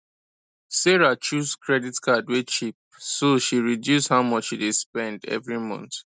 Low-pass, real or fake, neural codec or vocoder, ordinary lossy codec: none; real; none; none